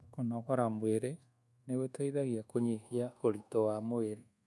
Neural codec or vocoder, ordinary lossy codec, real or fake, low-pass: codec, 24 kHz, 1.2 kbps, DualCodec; none; fake; none